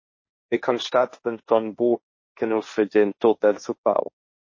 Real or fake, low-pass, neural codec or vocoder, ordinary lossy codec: fake; 7.2 kHz; codec, 16 kHz, 1.1 kbps, Voila-Tokenizer; MP3, 32 kbps